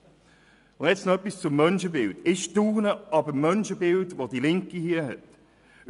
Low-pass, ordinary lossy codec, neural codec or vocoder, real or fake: 10.8 kHz; none; vocoder, 24 kHz, 100 mel bands, Vocos; fake